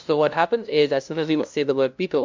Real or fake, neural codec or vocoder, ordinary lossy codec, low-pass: fake; codec, 16 kHz, 0.5 kbps, FunCodec, trained on LibriTTS, 25 frames a second; MP3, 64 kbps; 7.2 kHz